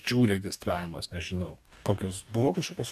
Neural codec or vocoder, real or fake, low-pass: codec, 44.1 kHz, 2.6 kbps, DAC; fake; 14.4 kHz